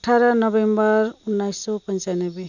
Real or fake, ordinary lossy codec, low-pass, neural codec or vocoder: real; none; 7.2 kHz; none